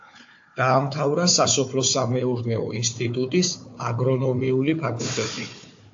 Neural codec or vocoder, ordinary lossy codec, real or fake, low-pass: codec, 16 kHz, 4 kbps, FunCodec, trained on Chinese and English, 50 frames a second; AAC, 48 kbps; fake; 7.2 kHz